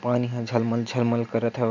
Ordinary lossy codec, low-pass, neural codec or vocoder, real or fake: none; 7.2 kHz; none; real